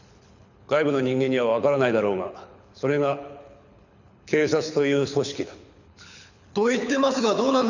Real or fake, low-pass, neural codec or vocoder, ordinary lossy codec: fake; 7.2 kHz; codec, 24 kHz, 6 kbps, HILCodec; none